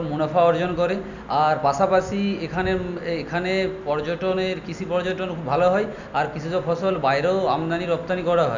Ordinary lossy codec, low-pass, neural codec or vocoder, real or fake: none; 7.2 kHz; none; real